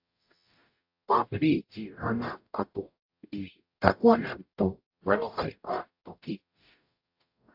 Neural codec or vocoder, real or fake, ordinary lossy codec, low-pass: codec, 44.1 kHz, 0.9 kbps, DAC; fake; AAC, 48 kbps; 5.4 kHz